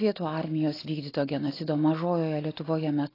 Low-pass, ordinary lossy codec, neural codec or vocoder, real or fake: 5.4 kHz; AAC, 24 kbps; none; real